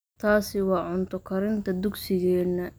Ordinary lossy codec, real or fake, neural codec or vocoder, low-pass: none; real; none; none